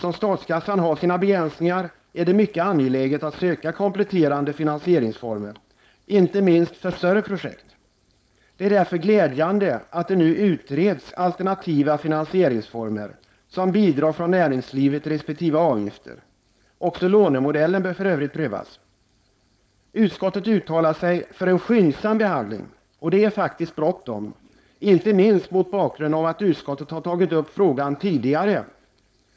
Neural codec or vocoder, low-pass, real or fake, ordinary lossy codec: codec, 16 kHz, 4.8 kbps, FACodec; none; fake; none